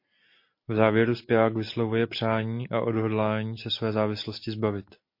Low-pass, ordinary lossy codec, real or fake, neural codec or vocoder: 5.4 kHz; MP3, 32 kbps; real; none